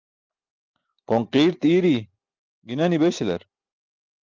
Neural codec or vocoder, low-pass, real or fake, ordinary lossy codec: none; 7.2 kHz; real; Opus, 24 kbps